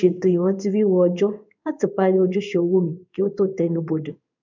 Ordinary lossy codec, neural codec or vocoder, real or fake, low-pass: none; codec, 16 kHz in and 24 kHz out, 1 kbps, XY-Tokenizer; fake; 7.2 kHz